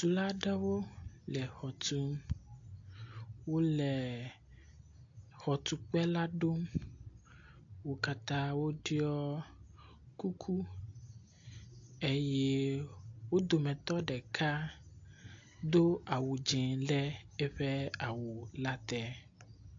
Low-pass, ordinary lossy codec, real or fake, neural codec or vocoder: 7.2 kHz; AAC, 48 kbps; real; none